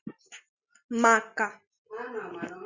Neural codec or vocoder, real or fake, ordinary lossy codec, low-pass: none; real; Opus, 64 kbps; 7.2 kHz